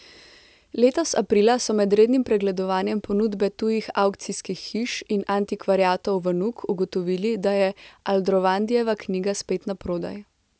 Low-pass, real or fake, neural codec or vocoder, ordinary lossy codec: none; real; none; none